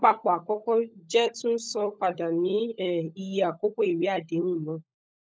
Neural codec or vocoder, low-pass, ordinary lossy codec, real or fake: codec, 16 kHz, 16 kbps, FunCodec, trained on LibriTTS, 50 frames a second; none; none; fake